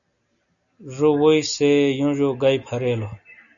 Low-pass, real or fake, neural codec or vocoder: 7.2 kHz; real; none